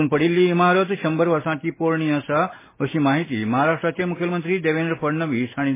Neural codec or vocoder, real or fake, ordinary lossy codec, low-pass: none; real; MP3, 16 kbps; 3.6 kHz